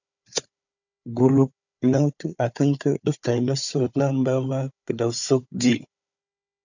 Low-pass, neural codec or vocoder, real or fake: 7.2 kHz; codec, 16 kHz, 4 kbps, FunCodec, trained on Chinese and English, 50 frames a second; fake